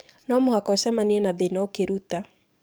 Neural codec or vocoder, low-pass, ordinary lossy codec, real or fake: codec, 44.1 kHz, 7.8 kbps, DAC; none; none; fake